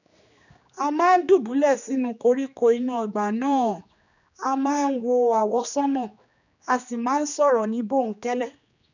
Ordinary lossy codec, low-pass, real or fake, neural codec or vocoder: none; 7.2 kHz; fake; codec, 16 kHz, 2 kbps, X-Codec, HuBERT features, trained on general audio